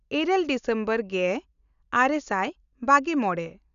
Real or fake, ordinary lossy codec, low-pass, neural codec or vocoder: real; none; 7.2 kHz; none